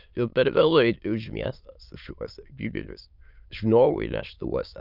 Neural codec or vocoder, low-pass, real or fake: autoencoder, 22.05 kHz, a latent of 192 numbers a frame, VITS, trained on many speakers; 5.4 kHz; fake